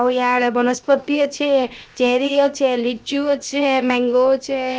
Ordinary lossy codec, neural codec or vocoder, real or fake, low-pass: none; codec, 16 kHz, 0.7 kbps, FocalCodec; fake; none